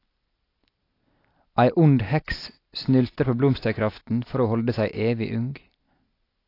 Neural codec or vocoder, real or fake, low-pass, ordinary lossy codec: none; real; 5.4 kHz; AAC, 32 kbps